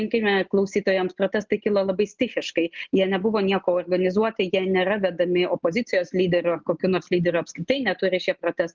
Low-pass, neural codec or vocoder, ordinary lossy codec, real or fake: 7.2 kHz; none; Opus, 32 kbps; real